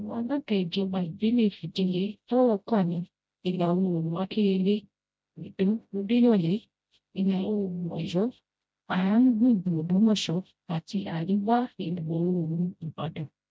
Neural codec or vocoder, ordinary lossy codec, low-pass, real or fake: codec, 16 kHz, 0.5 kbps, FreqCodec, smaller model; none; none; fake